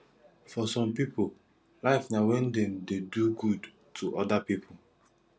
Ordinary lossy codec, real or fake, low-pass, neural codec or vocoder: none; real; none; none